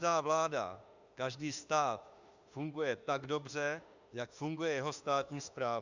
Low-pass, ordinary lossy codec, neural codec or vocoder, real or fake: 7.2 kHz; Opus, 64 kbps; autoencoder, 48 kHz, 32 numbers a frame, DAC-VAE, trained on Japanese speech; fake